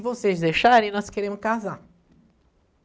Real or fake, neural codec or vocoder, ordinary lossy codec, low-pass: real; none; none; none